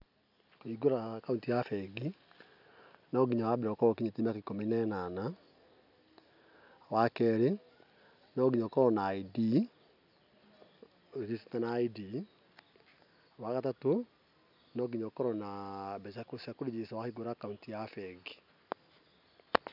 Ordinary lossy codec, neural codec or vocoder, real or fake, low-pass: none; none; real; 5.4 kHz